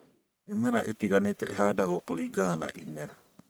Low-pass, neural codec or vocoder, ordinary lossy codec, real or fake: none; codec, 44.1 kHz, 1.7 kbps, Pupu-Codec; none; fake